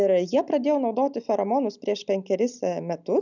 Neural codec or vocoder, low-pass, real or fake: none; 7.2 kHz; real